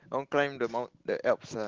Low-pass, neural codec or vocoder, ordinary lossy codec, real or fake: 7.2 kHz; none; Opus, 16 kbps; real